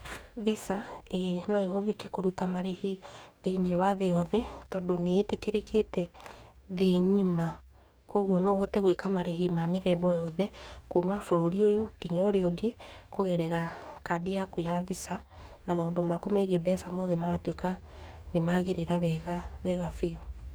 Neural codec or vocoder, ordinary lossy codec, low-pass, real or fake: codec, 44.1 kHz, 2.6 kbps, DAC; none; none; fake